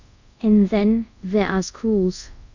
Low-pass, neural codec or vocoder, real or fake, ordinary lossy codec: 7.2 kHz; codec, 24 kHz, 0.5 kbps, DualCodec; fake; none